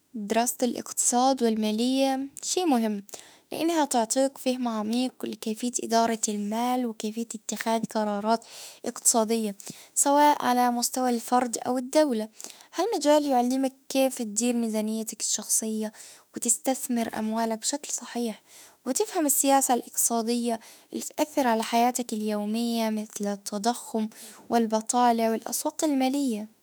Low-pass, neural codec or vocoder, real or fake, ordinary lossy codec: none; autoencoder, 48 kHz, 32 numbers a frame, DAC-VAE, trained on Japanese speech; fake; none